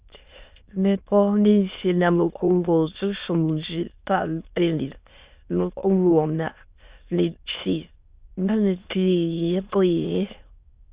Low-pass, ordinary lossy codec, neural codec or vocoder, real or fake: 3.6 kHz; Opus, 64 kbps; autoencoder, 22.05 kHz, a latent of 192 numbers a frame, VITS, trained on many speakers; fake